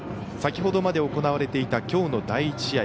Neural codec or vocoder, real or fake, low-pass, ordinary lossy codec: none; real; none; none